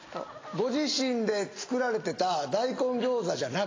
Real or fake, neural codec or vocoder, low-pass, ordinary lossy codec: real; none; 7.2 kHz; AAC, 32 kbps